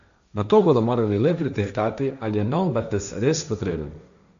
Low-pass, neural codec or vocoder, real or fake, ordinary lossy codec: 7.2 kHz; codec, 16 kHz, 1.1 kbps, Voila-Tokenizer; fake; none